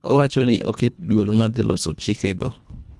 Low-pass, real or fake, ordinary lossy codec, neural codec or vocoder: none; fake; none; codec, 24 kHz, 1.5 kbps, HILCodec